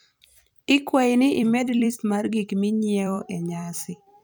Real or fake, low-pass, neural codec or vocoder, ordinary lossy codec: fake; none; vocoder, 44.1 kHz, 128 mel bands every 256 samples, BigVGAN v2; none